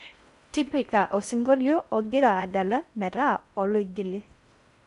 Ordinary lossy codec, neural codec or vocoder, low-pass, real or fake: none; codec, 16 kHz in and 24 kHz out, 0.6 kbps, FocalCodec, streaming, 4096 codes; 10.8 kHz; fake